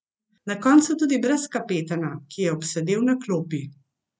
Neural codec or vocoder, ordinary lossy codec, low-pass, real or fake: none; none; none; real